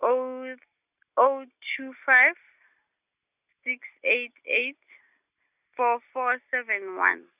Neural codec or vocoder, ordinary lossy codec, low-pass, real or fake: none; none; 3.6 kHz; real